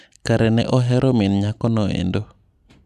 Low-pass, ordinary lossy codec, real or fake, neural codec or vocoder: 14.4 kHz; none; real; none